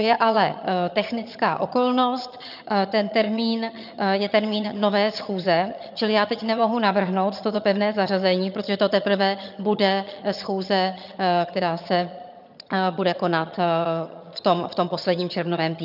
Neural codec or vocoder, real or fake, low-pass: vocoder, 22.05 kHz, 80 mel bands, HiFi-GAN; fake; 5.4 kHz